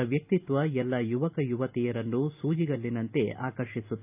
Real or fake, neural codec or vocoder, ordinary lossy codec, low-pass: real; none; AAC, 32 kbps; 3.6 kHz